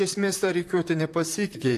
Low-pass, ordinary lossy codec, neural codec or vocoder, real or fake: 14.4 kHz; AAC, 64 kbps; vocoder, 44.1 kHz, 128 mel bands, Pupu-Vocoder; fake